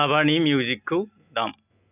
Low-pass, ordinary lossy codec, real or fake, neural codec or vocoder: 3.6 kHz; none; real; none